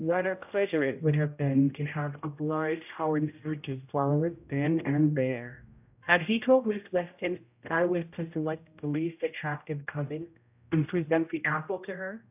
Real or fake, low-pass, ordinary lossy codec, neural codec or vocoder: fake; 3.6 kHz; AAC, 32 kbps; codec, 16 kHz, 0.5 kbps, X-Codec, HuBERT features, trained on general audio